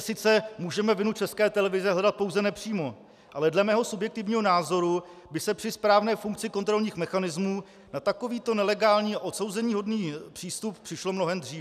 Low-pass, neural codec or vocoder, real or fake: 14.4 kHz; none; real